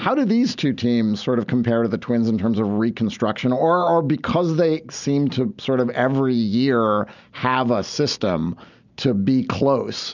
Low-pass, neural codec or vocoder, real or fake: 7.2 kHz; none; real